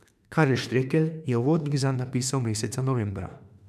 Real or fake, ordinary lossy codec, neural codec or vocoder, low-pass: fake; none; autoencoder, 48 kHz, 32 numbers a frame, DAC-VAE, trained on Japanese speech; 14.4 kHz